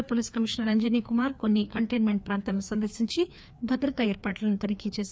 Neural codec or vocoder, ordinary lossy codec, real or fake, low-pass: codec, 16 kHz, 2 kbps, FreqCodec, larger model; none; fake; none